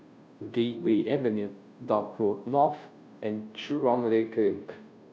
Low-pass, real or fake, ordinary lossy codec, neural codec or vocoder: none; fake; none; codec, 16 kHz, 0.5 kbps, FunCodec, trained on Chinese and English, 25 frames a second